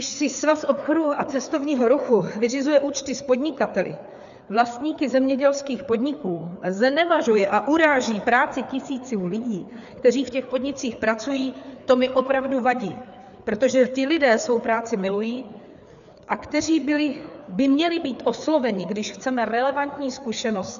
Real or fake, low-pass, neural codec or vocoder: fake; 7.2 kHz; codec, 16 kHz, 4 kbps, FreqCodec, larger model